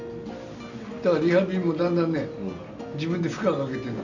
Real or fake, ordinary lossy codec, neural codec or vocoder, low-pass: real; none; none; 7.2 kHz